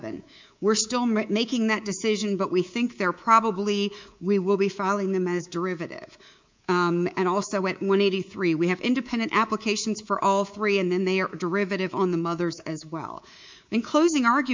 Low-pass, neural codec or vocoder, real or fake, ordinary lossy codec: 7.2 kHz; codec, 24 kHz, 3.1 kbps, DualCodec; fake; MP3, 64 kbps